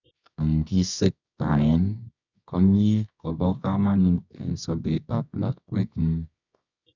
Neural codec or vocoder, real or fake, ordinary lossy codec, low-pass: codec, 24 kHz, 0.9 kbps, WavTokenizer, medium music audio release; fake; none; 7.2 kHz